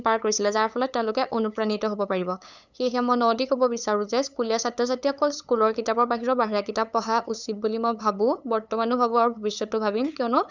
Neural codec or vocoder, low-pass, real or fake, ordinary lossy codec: codec, 16 kHz, 8 kbps, FunCodec, trained on LibriTTS, 25 frames a second; 7.2 kHz; fake; none